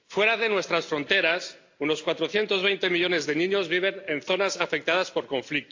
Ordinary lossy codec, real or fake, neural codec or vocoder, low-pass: AAC, 48 kbps; real; none; 7.2 kHz